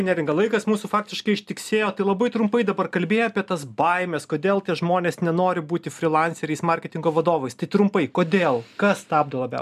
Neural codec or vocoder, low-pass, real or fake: none; 14.4 kHz; real